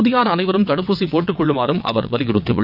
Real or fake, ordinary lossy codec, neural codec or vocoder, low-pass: fake; none; vocoder, 22.05 kHz, 80 mel bands, WaveNeXt; 5.4 kHz